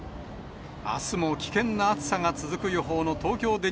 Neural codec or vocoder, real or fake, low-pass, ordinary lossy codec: none; real; none; none